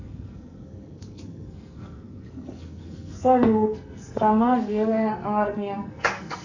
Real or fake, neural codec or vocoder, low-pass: fake; codec, 44.1 kHz, 2.6 kbps, SNAC; 7.2 kHz